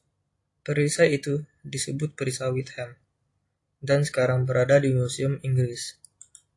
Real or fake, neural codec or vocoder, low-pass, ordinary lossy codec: real; none; 10.8 kHz; AAC, 48 kbps